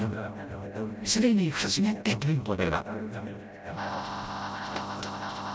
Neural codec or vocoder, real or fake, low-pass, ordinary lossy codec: codec, 16 kHz, 0.5 kbps, FreqCodec, smaller model; fake; none; none